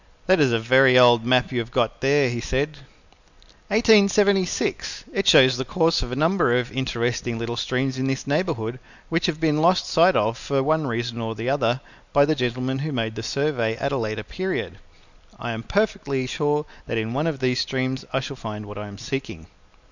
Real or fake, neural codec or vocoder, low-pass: real; none; 7.2 kHz